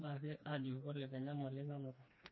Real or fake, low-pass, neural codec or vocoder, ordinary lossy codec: fake; 7.2 kHz; codec, 16 kHz, 2 kbps, FreqCodec, smaller model; MP3, 24 kbps